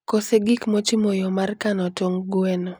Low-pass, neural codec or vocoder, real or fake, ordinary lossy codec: none; none; real; none